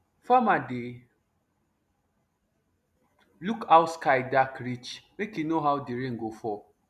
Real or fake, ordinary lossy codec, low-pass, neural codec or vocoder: real; none; 14.4 kHz; none